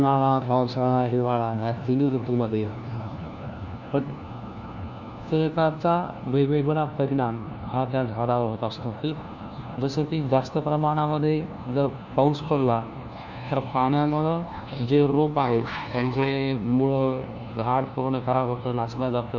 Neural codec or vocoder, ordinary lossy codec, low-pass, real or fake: codec, 16 kHz, 1 kbps, FunCodec, trained on LibriTTS, 50 frames a second; none; 7.2 kHz; fake